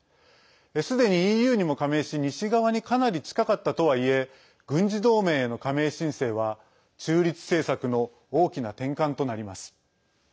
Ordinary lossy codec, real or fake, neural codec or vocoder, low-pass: none; real; none; none